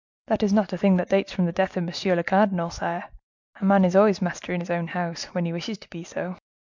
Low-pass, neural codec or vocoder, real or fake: 7.2 kHz; none; real